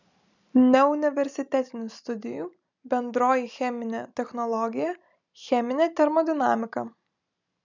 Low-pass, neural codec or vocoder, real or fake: 7.2 kHz; none; real